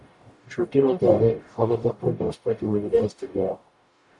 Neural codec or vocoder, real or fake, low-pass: codec, 44.1 kHz, 0.9 kbps, DAC; fake; 10.8 kHz